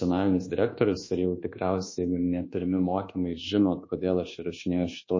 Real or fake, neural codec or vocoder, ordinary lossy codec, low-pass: fake; codec, 24 kHz, 1.2 kbps, DualCodec; MP3, 32 kbps; 7.2 kHz